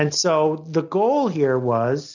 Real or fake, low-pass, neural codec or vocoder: real; 7.2 kHz; none